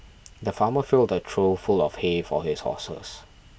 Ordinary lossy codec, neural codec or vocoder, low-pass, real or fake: none; none; none; real